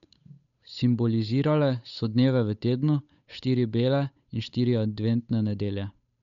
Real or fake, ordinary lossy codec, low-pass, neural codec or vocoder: fake; none; 7.2 kHz; codec, 16 kHz, 8 kbps, FunCodec, trained on Chinese and English, 25 frames a second